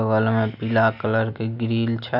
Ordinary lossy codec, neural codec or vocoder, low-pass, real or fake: none; none; 5.4 kHz; real